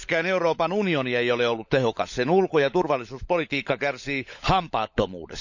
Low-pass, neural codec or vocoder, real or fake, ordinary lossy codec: 7.2 kHz; codec, 16 kHz, 16 kbps, FunCodec, trained on LibriTTS, 50 frames a second; fake; none